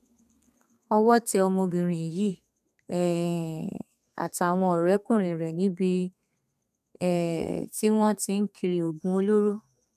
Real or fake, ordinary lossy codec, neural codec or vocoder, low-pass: fake; none; codec, 32 kHz, 1.9 kbps, SNAC; 14.4 kHz